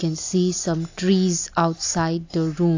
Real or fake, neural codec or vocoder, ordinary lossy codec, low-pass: real; none; AAC, 48 kbps; 7.2 kHz